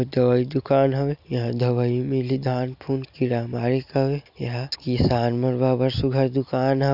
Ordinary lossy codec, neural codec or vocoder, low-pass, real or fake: none; none; 5.4 kHz; real